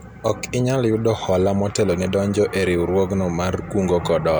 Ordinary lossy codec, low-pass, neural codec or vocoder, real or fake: none; none; none; real